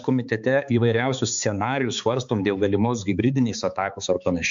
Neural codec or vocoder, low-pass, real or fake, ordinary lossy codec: codec, 16 kHz, 4 kbps, X-Codec, HuBERT features, trained on balanced general audio; 7.2 kHz; fake; MP3, 64 kbps